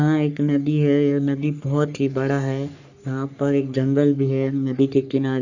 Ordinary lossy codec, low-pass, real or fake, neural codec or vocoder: none; 7.2 kHz; fake; codec, 44.1 kHz, 3.4 kbps, Pupu-Codec